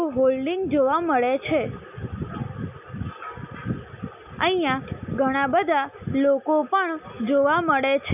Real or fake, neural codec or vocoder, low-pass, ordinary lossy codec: real; none; 3.6 kHz; none